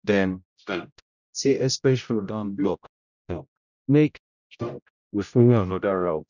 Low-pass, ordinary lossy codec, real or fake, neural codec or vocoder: 7.2 kHz; none; fake; codec, 16 kHz, 0.5 kbps, X-Codec, HuBERT features, trained on general audio